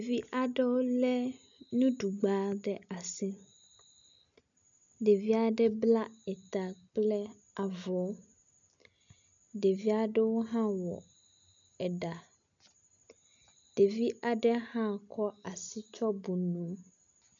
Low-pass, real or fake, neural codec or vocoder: 7.2 kHz; real; none